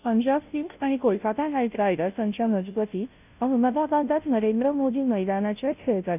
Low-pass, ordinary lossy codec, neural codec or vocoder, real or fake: 3.6 kHz; none; codec, 16 kHz, 0.5 kbps, FunCodec, trained on Chinese and English, 25 frames a second; fake